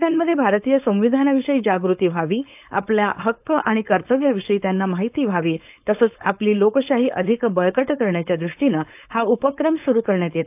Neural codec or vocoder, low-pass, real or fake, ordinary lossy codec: codec, 16 kHz, 4.8 kbps, FACodec; 3.6 kHz; fake; AAC, 32 kbps